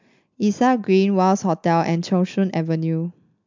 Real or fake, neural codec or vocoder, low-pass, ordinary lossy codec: real; none; 7.2 kHz; MP3, 64 kbps